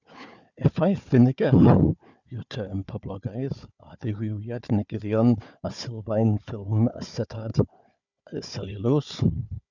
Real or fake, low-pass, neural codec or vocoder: fake; 7.2 kHz; codec, 16 kHz, 4 kbps, FunCodec, trained on Chinese and English, 50 frames a second